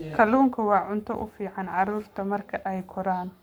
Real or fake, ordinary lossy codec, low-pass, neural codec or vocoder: fake; none; none; codec, 44.1 kHz, 7.8 kbps, Pupu-Codec